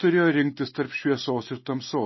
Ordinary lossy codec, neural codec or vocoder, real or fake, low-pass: MP3, 24 kbps; none; real; 7.2 kHz